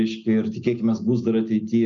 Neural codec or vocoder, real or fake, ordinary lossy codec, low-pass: none; real; MP3, 96 kbps; 7.2 kHz